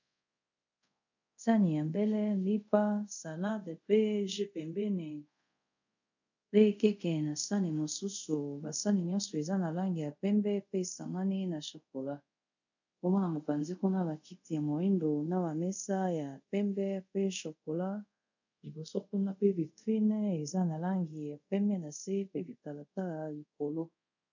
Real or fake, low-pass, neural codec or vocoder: fake; 7.2 kHz; codec, 24 kHz, 0.5 kbps, DualCodec